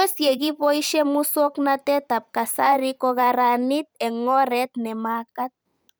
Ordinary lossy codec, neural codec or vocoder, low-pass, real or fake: none; vocoder, 44.1 kHz, 128 mel bands every 512 samples, BigVGAN v2; none; fake